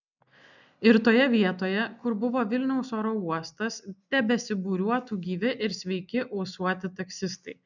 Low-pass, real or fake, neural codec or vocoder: 7.2 kHz; real; none